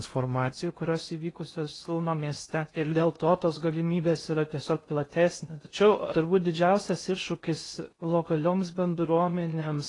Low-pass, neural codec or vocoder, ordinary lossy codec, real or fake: 10.8 kHz; codec, 16 kHz in and 24 kHz out, 0.6 kbps, FocalCodec, streaming, 2048 codes; AAC, 32 kbps; fake